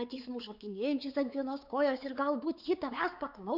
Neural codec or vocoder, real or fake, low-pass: codec, 16 kHz, 4 kbps, X-Codec, WavLM features, trained on Multilingual LibriSpeech; fake; 5.4 kHz